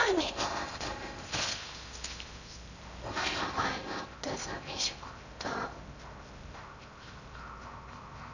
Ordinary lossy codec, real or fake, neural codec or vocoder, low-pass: none; fake; codec, 16 kHz in and 24 kHz out, 0.6 kbps, FocalCodec, streaming, 4096 codes; 7.2 kHz